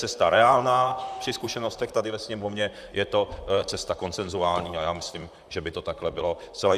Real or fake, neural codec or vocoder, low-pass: fake; vocoder, 44.1 kHz, 128 mel bands, Pupu-Vocoder; 14.4 kHz